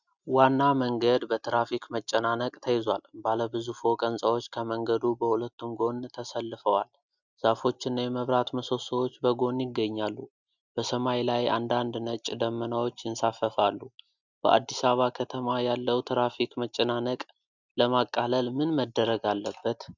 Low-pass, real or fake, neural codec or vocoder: 7.2 kHz; real; none